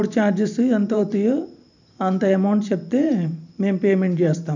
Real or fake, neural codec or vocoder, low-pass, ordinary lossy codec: fake; vocoder, 44.1 kHz, 128 mel bands every 512 samples, BigVGAN v2; 7.2 kHz; none